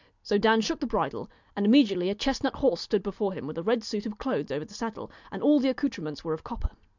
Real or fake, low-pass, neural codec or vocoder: real; 7.2 kHz; none